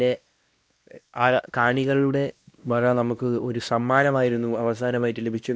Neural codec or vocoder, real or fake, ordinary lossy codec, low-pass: codec, 16 kHz, 1 kbps, X-Codec, WavLM features, trained on Multilingual LibriSpeech; fake; none; none